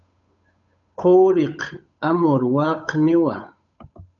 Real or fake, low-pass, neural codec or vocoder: fake; 7.2 kHz; codec, 16 kHz, 8 kbps, FunCodec, trained on Chinese and English, 25 frames a second